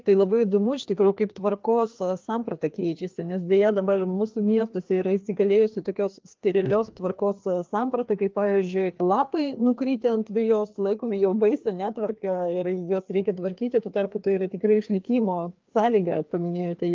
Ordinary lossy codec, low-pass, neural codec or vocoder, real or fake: Opus, 32 kbps; 7.2 kHz; codec, 16 kHz, 2 kbps, FreqCodec, larger model; fake